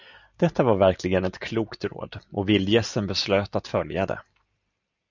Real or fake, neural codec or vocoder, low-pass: real; none; 7.2 kHz